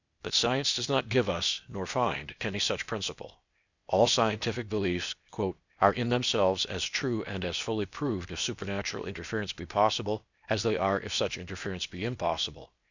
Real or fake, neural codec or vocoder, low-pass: fake; codec, 16 kHz, 0.8 kbps, ZipCodec; 7.2 kHz